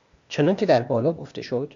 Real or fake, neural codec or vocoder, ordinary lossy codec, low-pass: fake; codec, 16 kHz, 0.8 kbps, ZipCodec; AAC, 64 kbps; 7.2 kHz